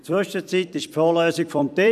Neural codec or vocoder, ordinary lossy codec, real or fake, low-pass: vocoder, 44.1 kHz, 128 mel bands every 256 samples, BigVGAN v2; none; fake; 14.4 kHz